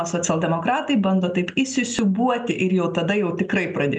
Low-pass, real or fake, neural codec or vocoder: 9.9 kHz; real; none